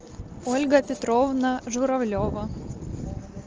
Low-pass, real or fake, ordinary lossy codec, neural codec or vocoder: 7.2 kHz; real; Opus, 24 kbps; none